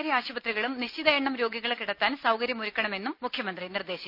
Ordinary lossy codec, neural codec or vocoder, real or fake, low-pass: none; none; real; 5.4 kHz